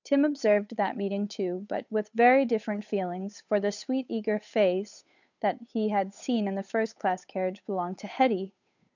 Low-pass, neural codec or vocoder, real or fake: 7.2 kHz; codec, 16 kHz, 8 kbps, FunCodec, trained on Chinese and English, 25 frames a second; fake